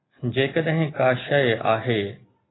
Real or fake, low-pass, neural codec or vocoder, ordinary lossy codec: real; 7.2 kHz; none; AAC, 16 kbps